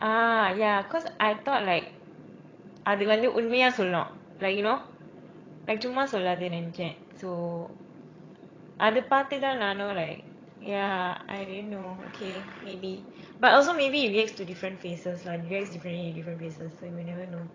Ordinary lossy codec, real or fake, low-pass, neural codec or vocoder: AAC, 32 kbps; fake; 7.2 kHz; vocoder, 22.05 kHz, 80 mel bands, HiFi-GAN